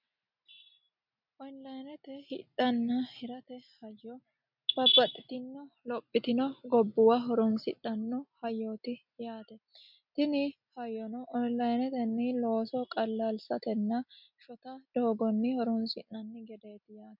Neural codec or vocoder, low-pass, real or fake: none; 5.4 kHz; real